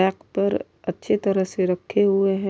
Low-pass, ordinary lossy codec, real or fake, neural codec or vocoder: none; none; real; none